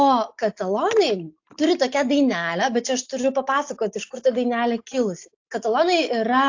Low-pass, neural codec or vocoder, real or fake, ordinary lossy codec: 7.2 kHz; none; real; AAC, 48 kbps